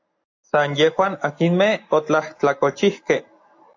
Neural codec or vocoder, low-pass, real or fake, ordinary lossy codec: none; 7.2 kHz; real; AAC, 48 kbps